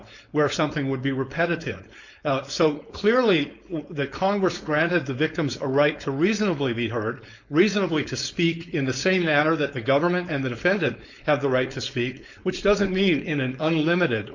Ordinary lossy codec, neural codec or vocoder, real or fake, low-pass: MP3, 64 kbps; codec, 16 kHz, 4.8 kbps, FACodec; fake; 7.2 kHz